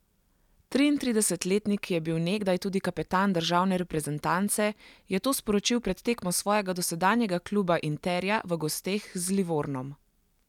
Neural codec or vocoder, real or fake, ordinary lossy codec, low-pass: none; real; none; 19.8 kHz